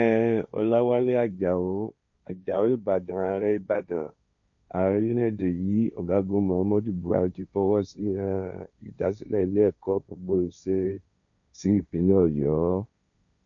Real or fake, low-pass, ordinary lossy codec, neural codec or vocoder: fake; 7.2 kHz; none; codec, 16 kHz, 1.1 kbps, Voila-Tokenizer